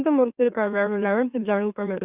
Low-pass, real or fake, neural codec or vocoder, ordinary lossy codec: 3.6 kHz; fake; autoencoder, 44.1 kHz, a latent of 192 numbers a frame, MeloTTS; Opus, 64 kbps